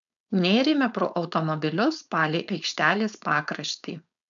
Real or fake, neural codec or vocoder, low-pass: fake; codec, 16 kHz, 4.8 kbps, FACodec; 7.2 kHz